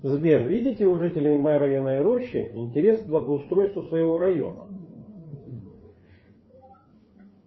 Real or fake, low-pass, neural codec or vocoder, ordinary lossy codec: fake; 7.2 kHz; codec, 16 kHz, 4 kbps, FreqCodec, larger model; MP3, 24 kbps